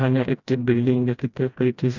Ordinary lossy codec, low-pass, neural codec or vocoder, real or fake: none; 7.2 kHz; codec, 16 kHz, 1 kbps, FreqCodec, smaller model; fake